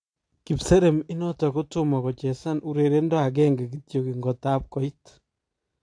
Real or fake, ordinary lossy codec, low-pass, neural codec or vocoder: real; AAC, 48 kbps; 9.9 kHz; none